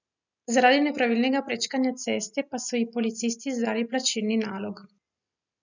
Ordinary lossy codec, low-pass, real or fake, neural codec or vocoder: none; 7.2 kHz; real; none